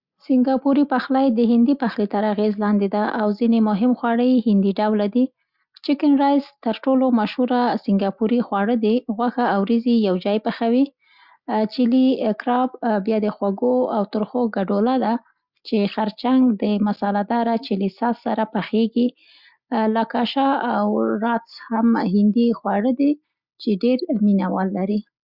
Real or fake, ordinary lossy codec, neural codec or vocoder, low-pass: real; Opus, 64 kbps; none; 5.4 kHz